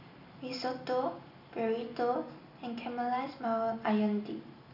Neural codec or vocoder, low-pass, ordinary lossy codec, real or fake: none; 5.4 kHz; none; real